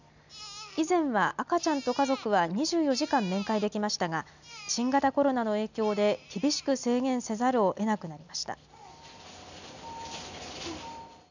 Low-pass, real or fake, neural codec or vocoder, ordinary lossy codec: 7.2 kHz; real; none; none